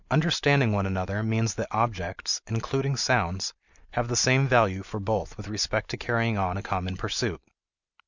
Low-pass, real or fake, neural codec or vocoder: 7.2 kHz; real; none